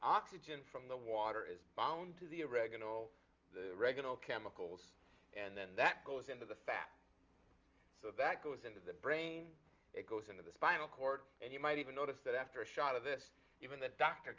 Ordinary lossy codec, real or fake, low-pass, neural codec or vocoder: Opus, 32 kbps; real; 7.2 kHz; none